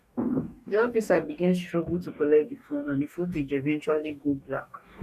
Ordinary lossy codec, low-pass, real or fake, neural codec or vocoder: none; 14.4 kHz; fake; codec, 44.1 kHz, 2.6 kbps, DAC